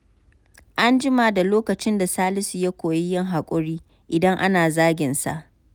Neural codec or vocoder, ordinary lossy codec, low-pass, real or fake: none; none; none; real